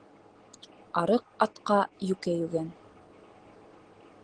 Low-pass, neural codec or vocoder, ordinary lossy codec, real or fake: 9.9 kHz; none; Opus, 16 kbps; real